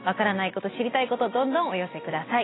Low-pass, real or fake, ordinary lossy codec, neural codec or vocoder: 7.2 kHz; real; AAC, 16 kbps; none